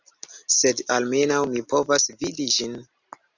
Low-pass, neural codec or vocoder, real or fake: 7.2 kHz; none; real